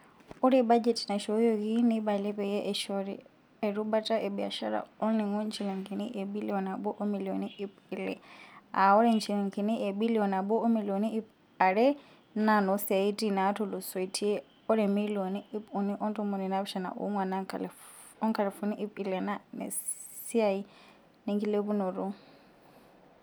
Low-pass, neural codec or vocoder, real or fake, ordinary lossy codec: none; none; real; none